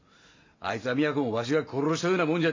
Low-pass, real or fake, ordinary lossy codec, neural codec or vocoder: 7.2 kHz; real; MP3, 48 kbps; none